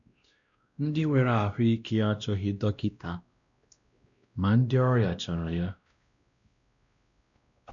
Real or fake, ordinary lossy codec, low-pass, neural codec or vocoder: fake; MP3, 96 kbps; 7.2 kHz; codec, 16 kHz, 1 kbps, X-Codec, WavLM features, trained on Multilingual LibriSpeech